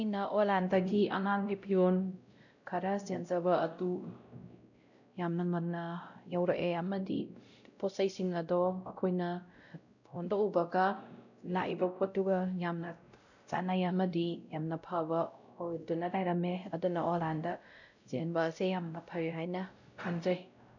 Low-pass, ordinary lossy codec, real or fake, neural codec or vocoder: 7.2 kHz; none; fake; codec, 16 kHz, 0.5 kbps, X-Codec, WavLM features, trained on Multilingual LibriSpeech